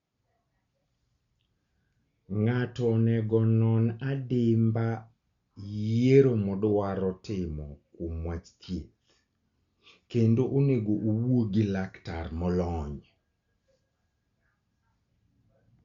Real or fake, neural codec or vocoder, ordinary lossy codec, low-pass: real; none; Opus, 64 kbps; 7.2 kHz